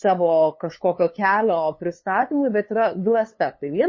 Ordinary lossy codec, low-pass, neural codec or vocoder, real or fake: MP3, 32 kbps; 7.2 kHz; codec, 16 kHz, 2 kbps, FunCodec, trained on LibriTTS, 25 frames a second; fake